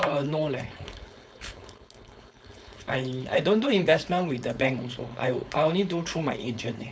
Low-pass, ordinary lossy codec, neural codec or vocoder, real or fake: none; none; codec, 16 kHz, 4.8 kbps, FACodec; fake